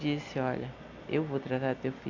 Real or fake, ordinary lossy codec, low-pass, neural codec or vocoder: real; none; 7.2 kHz; none